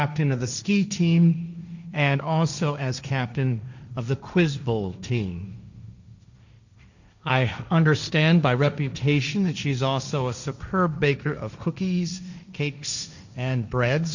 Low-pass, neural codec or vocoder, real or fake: 7.2 kHz; codec, 16 kHz, 1.1 kbps, Voila-Tokenizer; fake